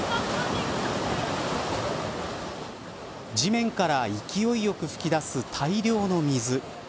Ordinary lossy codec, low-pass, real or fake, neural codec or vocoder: none; none; real; none